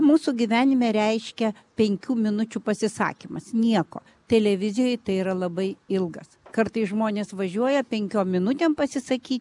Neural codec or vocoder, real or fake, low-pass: none; real; 10.8 kHz